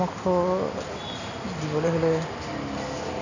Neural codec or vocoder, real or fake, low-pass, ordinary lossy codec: none; real; 7.2 kHz; none